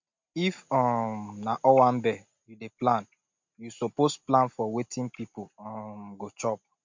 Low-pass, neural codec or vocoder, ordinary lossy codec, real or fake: 7.2 kHz; none; MP3, 48 kbps; real